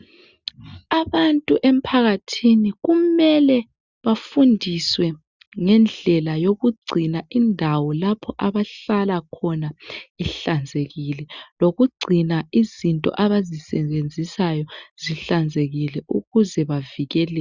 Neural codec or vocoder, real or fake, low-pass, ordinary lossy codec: none; real; 7.2 kHz; Opus, 64 kbps